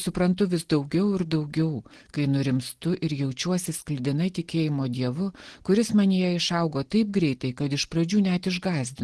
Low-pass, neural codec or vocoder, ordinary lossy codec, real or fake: 10.8 kHz; none; Opus, 16 kbps; real